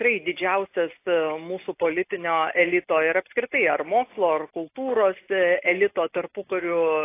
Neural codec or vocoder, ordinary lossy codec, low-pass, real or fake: none; AAC, 24 kbps; 3.6 kHz; real